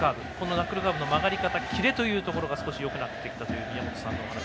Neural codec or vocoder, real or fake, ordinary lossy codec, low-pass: none; real; none; none